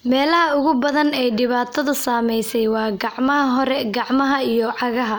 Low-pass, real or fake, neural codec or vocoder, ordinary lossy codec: none; real; none; none